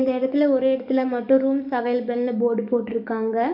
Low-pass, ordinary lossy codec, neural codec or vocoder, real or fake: 5.4 kHz; none; codec, 44.1 kHz, 7.8 kbps, DAC; fake